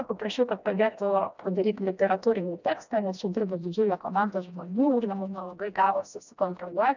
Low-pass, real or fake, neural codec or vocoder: 7.2 kHz; fake; codec, 16 kHz, 1 kbps, FreqCodec, smaller model